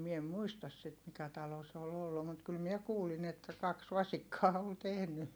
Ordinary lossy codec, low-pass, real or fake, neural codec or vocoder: none; none; real; none